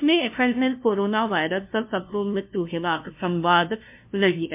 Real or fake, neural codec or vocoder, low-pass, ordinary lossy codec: fake; codec, 16 kHz, 0.5 kbps, FunCodec, trained on LibriTTS, 25 frames a second; 3.6 kHz; MP3, 32 kbps